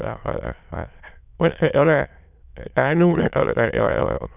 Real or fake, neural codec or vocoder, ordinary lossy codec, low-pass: fake; autoencoder, 22.05 kHz, a latent of 192 numbers a frame, VITS, trained on many speakers; none; 3.6 kHz